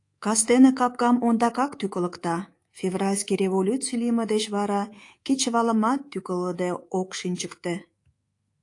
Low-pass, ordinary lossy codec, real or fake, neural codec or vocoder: 10.8 kHz; AAC, 48 kbps; fake; codec, 24 kHz, 3.1 kbps, DualCodec